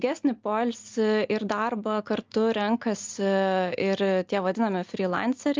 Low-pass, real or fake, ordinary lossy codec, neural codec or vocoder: 7.2 kHz; real; Opus, 24 kbps; none